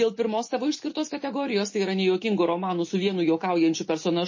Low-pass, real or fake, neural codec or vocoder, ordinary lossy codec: 7.2 kHz; real; none; MP3, 32 kbps